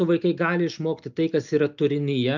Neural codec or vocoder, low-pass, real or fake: none; 7.2 kHz; real